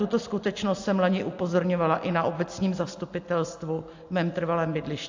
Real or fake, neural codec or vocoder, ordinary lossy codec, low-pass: real; none; AAC, 48 kbps; 7.2 kHz